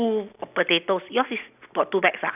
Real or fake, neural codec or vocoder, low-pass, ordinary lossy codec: real; none; 3.6 kHz; none